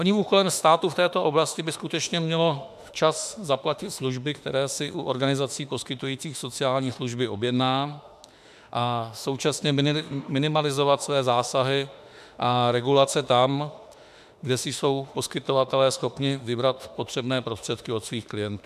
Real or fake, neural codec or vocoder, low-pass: fake; autoencoder, 48 kHz, 32 numbers a frame, DAC-VAE, trained on Japanese speech; 14.4 kHz